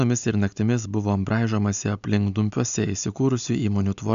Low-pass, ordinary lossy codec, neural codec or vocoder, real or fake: 7.2 kHz; AAC, 96 kbps; none; real